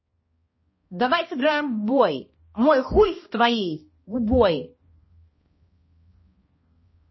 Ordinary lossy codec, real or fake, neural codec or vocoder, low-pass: MP3, 24 kbps; fake; codec, 16 kHz, 1 kbps, X-Codec, HuBERT features, trained on balanced general audio; 7.2 kHz